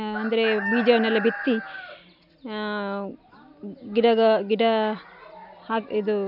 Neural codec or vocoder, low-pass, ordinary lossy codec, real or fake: none; 5.4 kHz; none; real